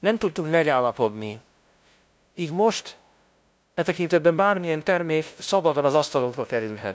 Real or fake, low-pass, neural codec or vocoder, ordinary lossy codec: fake; none; codec, 16 kHz, 0.5 kbps, FunCodec, trained on LibriTTS, 25 frames a second; none